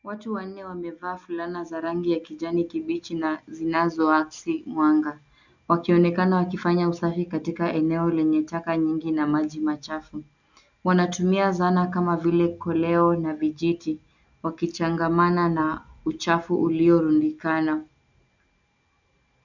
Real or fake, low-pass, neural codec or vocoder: real; 7.2 kHz; none